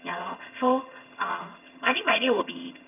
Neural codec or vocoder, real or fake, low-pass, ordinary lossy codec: vocoder, 22.05 kHz, 80 mel bands, HiFi-GAN; fake; 3.6 kHz; none